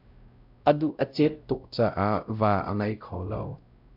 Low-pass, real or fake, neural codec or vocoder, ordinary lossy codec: 5.4 kHz; fake; codec, 16 kHz, 0.5 kbps, X-Codec, WavLM features, trained on Multilingual LibriSpeech; AAC, 48 kbps